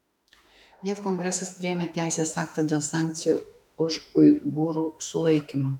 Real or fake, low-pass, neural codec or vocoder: fake; 19.8 kHz; autoencoder, 48 kHz, 32 numbers a frame, DAC-VAE, trained on Japanese speech